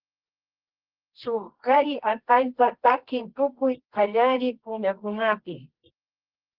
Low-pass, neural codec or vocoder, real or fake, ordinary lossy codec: 5.4 kHz; codec, 24 kHz, 0.9 kbps, WavTokenizer, medium music audio release; fake; Opus, 16 kbps